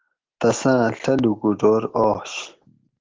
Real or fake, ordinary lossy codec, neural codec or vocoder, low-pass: real; Opus, 16 kbps; none; 7.2 kHz